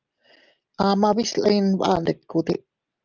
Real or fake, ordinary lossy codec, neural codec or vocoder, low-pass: real; Opus, 32 kbps; none; 7.2 kHz